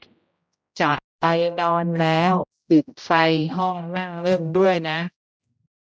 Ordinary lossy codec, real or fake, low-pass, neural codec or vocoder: none; fake; none; codec, 16 kHz, 0.5 kbps, X-Codec, HuBERT features, trained on general audio